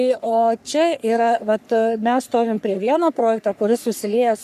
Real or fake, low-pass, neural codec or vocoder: fake; 14.4 kHz; codec, 44.1 kHz, 3.4 kbps, Pupu-Codec